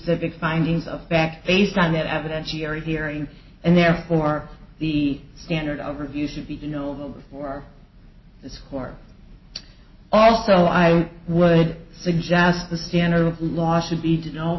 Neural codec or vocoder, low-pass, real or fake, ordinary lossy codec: none; 7.2 kHz; real; MP3, 24 kbps